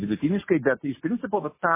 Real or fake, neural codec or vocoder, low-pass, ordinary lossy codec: real; none; 3.6 kHz; MP3, 16 kbps